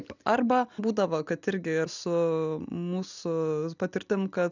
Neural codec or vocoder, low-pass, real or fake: none; 7.2 kHz; real